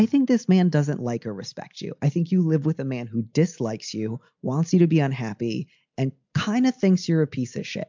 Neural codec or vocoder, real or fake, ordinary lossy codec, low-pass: none; real; MP3, 64 kbps; 7.2 kHz